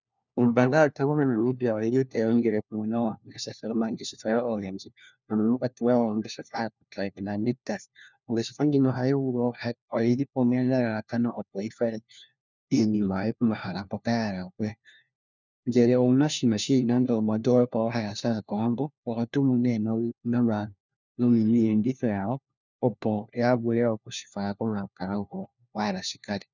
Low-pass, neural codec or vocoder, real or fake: 7.2 kHz; codec, 16 kHz, 1 kbps, FunCodec, trained on LibriTTS, 50 frames a second; fake